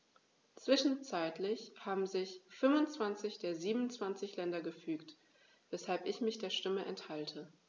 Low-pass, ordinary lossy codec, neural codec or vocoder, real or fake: none; none; none; real